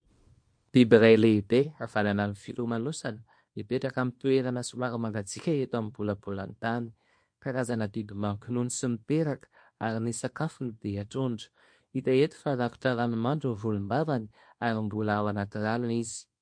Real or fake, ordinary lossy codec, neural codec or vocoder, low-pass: fake; MP3, 48 kbps; codec, 24 kHz, 0.9 kbps, WavTokenizer, small release; 9.9 kHz